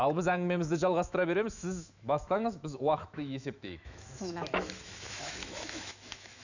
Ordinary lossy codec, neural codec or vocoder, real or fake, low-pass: none; autoencoder, 48 kHz, 128 numbers a frame, DAC-VAE, trained on Japanese speech; fake; 7.2 kHz